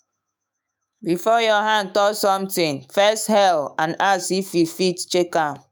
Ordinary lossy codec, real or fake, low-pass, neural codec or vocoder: none; fake; none; autoencoder, 48 kHz, 128 numbers a frame, DAC-VAE, trained on Japanese speech